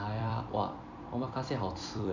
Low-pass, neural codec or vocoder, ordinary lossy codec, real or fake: 7.2 kHz; vocoder, 44.1 kHz, 128 mel bands every 256 samples, BigVGAN v2; none; fake